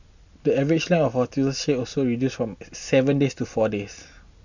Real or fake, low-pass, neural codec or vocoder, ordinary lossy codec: real; 7.2 kHz; none; none